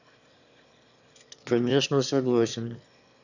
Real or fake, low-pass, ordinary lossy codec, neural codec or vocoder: fake; 7.2 kHz; none; autoencoder, 22.05 kHz, a latent of 192 numbers a frame, VITS, trained on one speaker